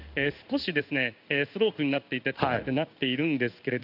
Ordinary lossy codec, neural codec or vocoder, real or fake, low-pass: none; codec, 16 kHz in and 24 kHz out, 1 kbps, XY-Tokenizer; fake; 5.4 kHz